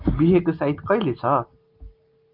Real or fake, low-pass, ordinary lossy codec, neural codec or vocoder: real; 5.4 kHz; Opus, 24 kbps; none